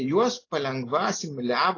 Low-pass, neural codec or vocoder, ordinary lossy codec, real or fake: 7.2 kHz; none; AAC, 32 kbps; real